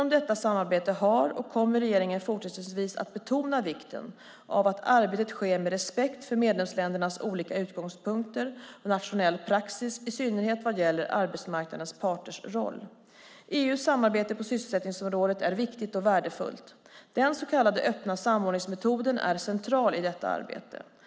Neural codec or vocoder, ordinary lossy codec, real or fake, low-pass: none; none; real; none